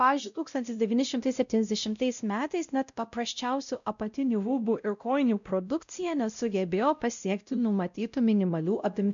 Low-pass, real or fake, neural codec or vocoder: 7.2 kHz; fake; codec, 16 kHz, 0.5 kbps, X-Codec, WavLM features, trained on Multilingual LibriSpeech